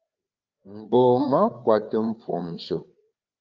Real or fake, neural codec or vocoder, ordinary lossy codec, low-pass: fake; codec, 16 kHz, 4 kbps, FreqCodec, larger model; Opus, 32 kbps; 7.2 kHz